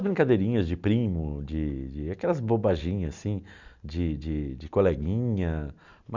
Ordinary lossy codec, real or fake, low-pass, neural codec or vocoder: none; real; 7.2 kHz; none